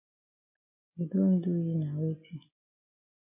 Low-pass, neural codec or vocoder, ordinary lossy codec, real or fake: 3.6 kHz; none; MP3, 32 kbps; real